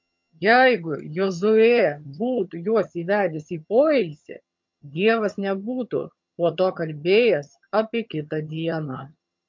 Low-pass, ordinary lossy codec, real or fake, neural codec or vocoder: 7.2 kHz; MP3, 48 kbps; fake; vocoder, 22.05 kHz, 80 mel bands, HiFi-GAN